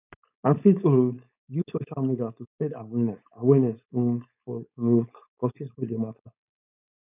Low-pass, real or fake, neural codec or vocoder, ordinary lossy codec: 3.6 kHz; fake; codec, 16 kHz, 4.8 kbps, FACodec; none